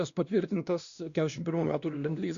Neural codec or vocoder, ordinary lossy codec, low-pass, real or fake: codec, 16 kHz, 1 kbps, X-Codec, WavLM features, trained on Multilingual LibriSpeech; Opus, 64 kbps; 7.2 kHz; fake